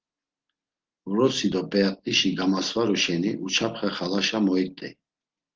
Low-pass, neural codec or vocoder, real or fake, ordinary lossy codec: 7.2 kHz; none; real; Opus, 16 kbps